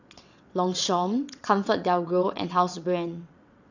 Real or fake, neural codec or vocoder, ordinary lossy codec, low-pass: fake; vocoder, 22.05 kHz, 80 mel bands, WaveNeXt; none; 7.2 kHz